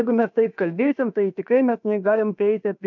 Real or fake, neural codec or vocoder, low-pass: fake; codec, 16 kHz, about 1 kbps, DyCAST, with the encoder's durations; 7.2 kHz